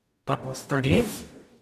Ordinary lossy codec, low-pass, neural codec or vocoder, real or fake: none; 14.4 kHz; codec, 44.1 kHz, 0.9 kbps, DAC; fake